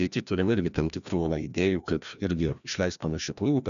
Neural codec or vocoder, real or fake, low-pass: codec, 16 kHz, 1 kbps, FreqCodec, larger model; fake; 7.2 kHz